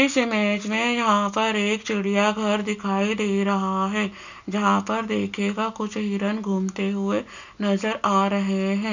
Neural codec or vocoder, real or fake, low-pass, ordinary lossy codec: none; real; 7.2 kHz; none